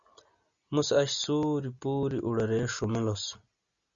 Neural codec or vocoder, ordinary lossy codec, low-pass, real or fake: none; Opus, 64 kbps; 7.2 kHz; real